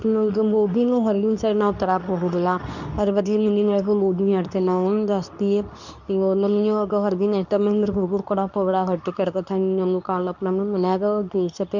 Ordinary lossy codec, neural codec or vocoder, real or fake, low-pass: none; codec, 24 kHz, 0.9 kbps, WavTokenizer, medium speech release version 2; fake; 7.2 kHz